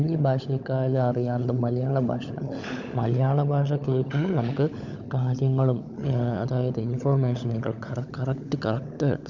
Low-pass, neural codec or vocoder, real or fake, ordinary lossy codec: 7.2 kHz; codec, 16 kHz, 16 kbps, FunCodec, trained on LibriTTS, 50 frames a second; fake; none